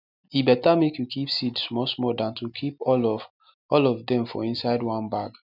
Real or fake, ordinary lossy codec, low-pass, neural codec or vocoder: real; none; 5.4 kHz; none